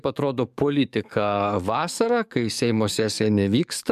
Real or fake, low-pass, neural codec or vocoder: fake; 14.4 kHz; codec, 44.1 kHz, 7.8 kbps, DAC